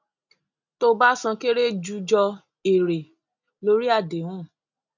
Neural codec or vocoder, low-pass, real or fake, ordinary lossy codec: none; 7.2 kHz; real; none